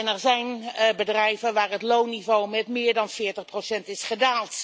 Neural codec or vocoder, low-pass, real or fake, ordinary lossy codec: none; none; real; none